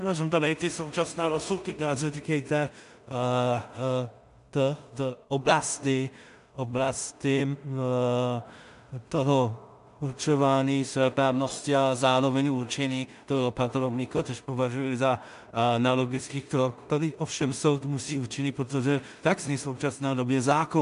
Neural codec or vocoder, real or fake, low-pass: codec, 16 kHz in and 24 kHz out, 0.4 kbps, LongCat-Audio-Codec, two codebook decoder; fake; 10.8 kHz